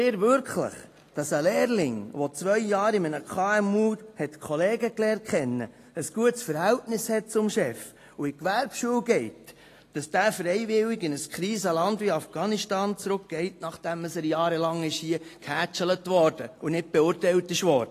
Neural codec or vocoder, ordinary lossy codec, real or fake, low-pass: none; AAC, 48 kbps; real; 14.4 kHz